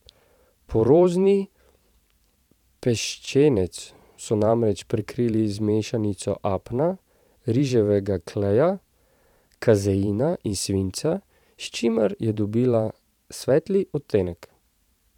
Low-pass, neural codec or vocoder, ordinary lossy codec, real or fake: 19.8 kHz; vocoder, 44.1 kHz, 128 mel bands every 512 samples, BigVGAN v2; none; fake